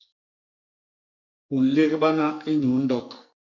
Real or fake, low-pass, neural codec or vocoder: fake; 7.2 kHz; codec, 44.1 kHz, 2.6 kbps, SNAC